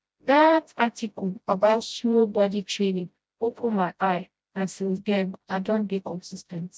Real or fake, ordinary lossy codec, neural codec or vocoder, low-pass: fake; none; codec, 16 kHz, 0.5 kbps, FreqCodec, smaller model; none